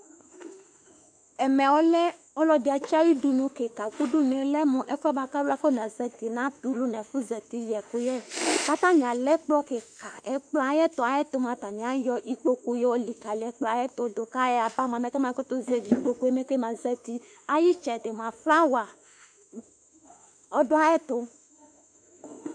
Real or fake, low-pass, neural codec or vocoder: fake; 9.9 kHz; autoencoder, 48 kHz, 32 numbers a frame, DAC-VAE, trained on Japanese speech